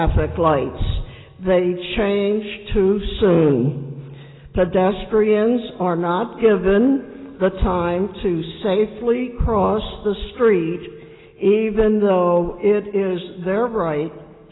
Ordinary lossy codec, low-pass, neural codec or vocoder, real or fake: AAC, 16 kbps; 7.2 kHz; none; real